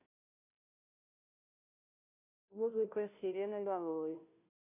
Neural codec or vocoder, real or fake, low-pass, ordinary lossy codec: codec, 16 kHz, 0.5 kbps, FunCodec, trained on Chinese and English, 25 frames a second; fake; 3.6 kHz; none